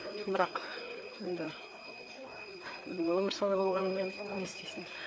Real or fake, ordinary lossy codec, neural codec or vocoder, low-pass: fake; none; codec, 16 kHz, 4 kbps, FreqCodec, larger model; none